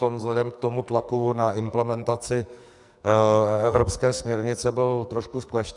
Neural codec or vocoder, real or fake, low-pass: codec, 44.1 kHz, 2.6 kbps, SNAC; fake; 10.8 kHz